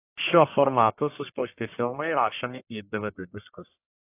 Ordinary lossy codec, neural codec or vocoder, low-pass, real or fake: AAC, 32 kbps; codec, 44.1 kHz, 1.7 kbps, Pupu-Codec; 3.6 kHz; fake